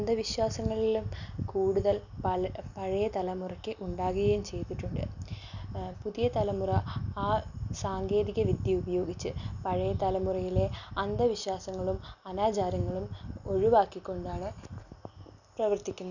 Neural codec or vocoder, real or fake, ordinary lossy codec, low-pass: none; real; none; 7.2 kHz